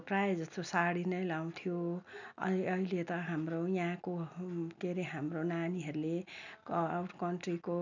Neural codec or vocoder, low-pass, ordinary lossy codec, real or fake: none; 7.2 kHz; none; real